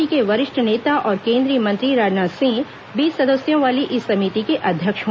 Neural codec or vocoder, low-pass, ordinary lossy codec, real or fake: none; none; none; real